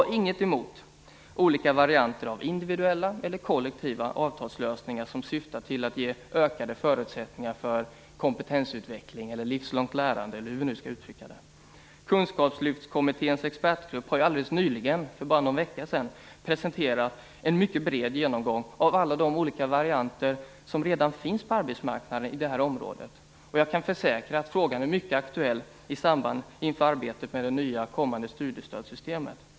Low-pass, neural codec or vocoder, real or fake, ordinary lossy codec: none; none; real; none